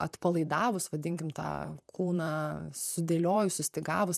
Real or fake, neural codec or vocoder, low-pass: fake; vocoder, 44.1 kHz, 128 mel bands, Pupu-Vocoder; 14.4 kHz